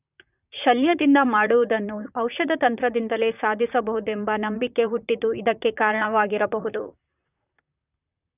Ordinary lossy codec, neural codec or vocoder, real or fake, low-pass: none; vocoder, 44.1 kHz, 128 mel bands, Pupu-Vocoder; fake; 3.6 kHz